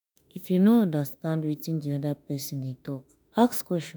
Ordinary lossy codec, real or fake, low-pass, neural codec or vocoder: none; fake; none; autoencoder, 48 kHz, 32 numbers a frame, DAC-VAE, trained on Japanese speech